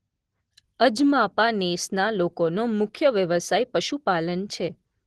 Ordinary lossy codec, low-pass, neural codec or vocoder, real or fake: Opus, 16 kbps; 10.8 kHz; none; real